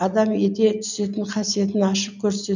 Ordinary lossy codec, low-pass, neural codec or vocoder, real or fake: none; 7.2 kHz; none; real